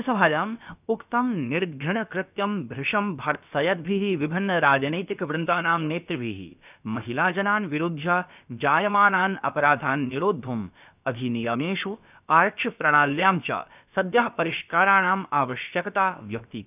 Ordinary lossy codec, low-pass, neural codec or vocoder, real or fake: none; 3.6 kHz; codec, 16 kHz, about 1 kbps, DyCAST, with the encoder's durations; fake